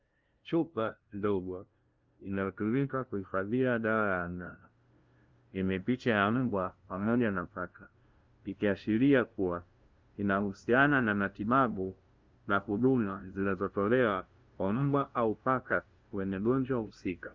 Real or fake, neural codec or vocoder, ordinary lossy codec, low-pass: fake; codec, 16 kHz, 0.5 kbps, FunCodec, trained on LibriTTS, 25 frames a second; Opus, 32 kbps; 7.2 kHz